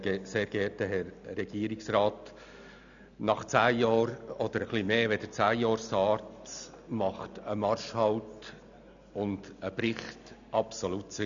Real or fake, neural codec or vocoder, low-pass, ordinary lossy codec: real; none; 7.2 kHz; none